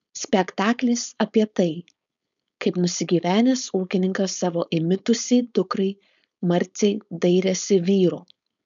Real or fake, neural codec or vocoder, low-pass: fake; codec, 16 kHz, 4.8 kbps, FACodec; 7.2 kHz